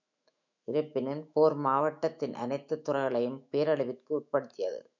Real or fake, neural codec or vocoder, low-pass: fake; autoencoder, 48 kHz, 128 numbers a frame, DAC-VAE, trained on Japanese speech; 7.2 kHz